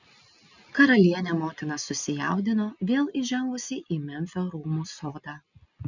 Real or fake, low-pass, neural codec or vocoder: real; 7.2 kHz; none